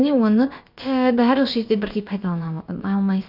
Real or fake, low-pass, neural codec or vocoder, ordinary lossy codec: fake; 5.4 kHz; codec, 16 kHz, about 1 kbps, DyCAST, with the encoder's durations; none